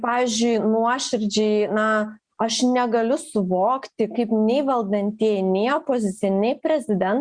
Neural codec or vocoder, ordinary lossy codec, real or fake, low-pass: none; Opus, 64 kbps; real; 9.9 kHz